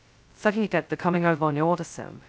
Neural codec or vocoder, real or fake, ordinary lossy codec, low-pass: codec, 16 kHz, 0.2 kbps, FocalCodec; fake; none; none